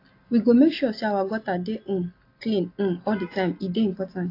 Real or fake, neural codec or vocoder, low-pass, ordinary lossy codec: real; none; 5.4 kHz; AAC, 32 kbps